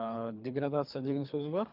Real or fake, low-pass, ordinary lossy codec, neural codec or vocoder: fake; 5.4 kHz; none; codec, 24 kHz, 3 kbps, HILCodec